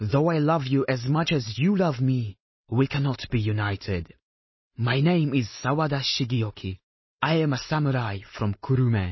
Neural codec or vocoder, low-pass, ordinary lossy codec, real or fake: none; 7.2 kHz; MP3, 24 kbps; real